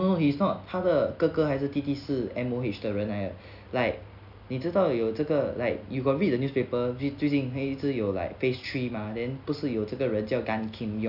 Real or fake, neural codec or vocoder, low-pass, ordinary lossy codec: real; none; 5.4 kHz; none